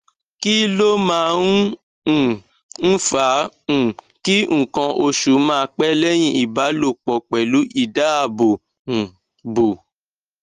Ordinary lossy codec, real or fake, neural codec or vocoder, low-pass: Opus, 24 kbps; real; none; 14.4 kHz